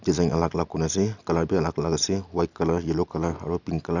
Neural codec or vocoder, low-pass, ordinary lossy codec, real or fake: none; 7.2 kHz; none; real